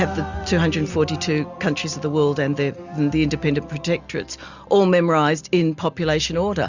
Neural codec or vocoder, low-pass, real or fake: none; 7.2 kHz; real